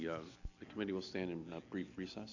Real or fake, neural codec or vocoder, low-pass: real; none; 7.2 kHz